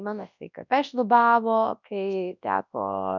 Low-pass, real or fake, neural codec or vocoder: 7.2 kHz; fake; codec, 24 kHz, 0.9 kbps, WavTokenizer, large speech release